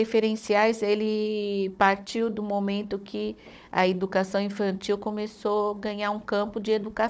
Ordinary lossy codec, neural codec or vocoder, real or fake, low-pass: none; codec, 16 kHz, 16 kbps, FunCodec, trained on Chinese and English, 50 frames a second; fake; none